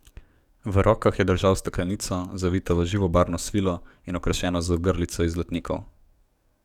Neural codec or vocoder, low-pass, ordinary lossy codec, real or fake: codec, 44.1 kHz, 7.8 kbps, DAC; 19.8 kHz; none; fake